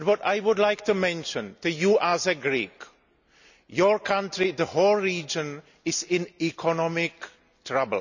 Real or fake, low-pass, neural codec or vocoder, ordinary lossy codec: real; 7.2 kHz; none; none